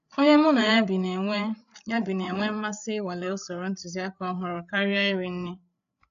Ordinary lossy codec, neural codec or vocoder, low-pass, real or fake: none; codec, 16 kHz, 8 kbps, FreqCodec, larger model; 7.2 kHz; fake